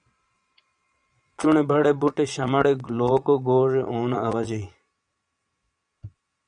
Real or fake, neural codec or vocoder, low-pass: fake; vocoder, 22.05 kHz, 80 mel bands, Vocos; 9.9 kHz